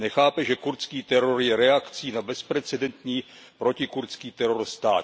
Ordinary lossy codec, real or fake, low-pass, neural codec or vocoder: none; real; none; none